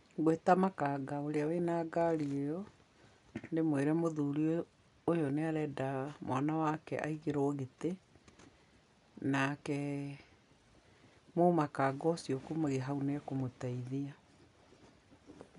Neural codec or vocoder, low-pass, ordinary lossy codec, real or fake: none; 10.8 kHz; none; real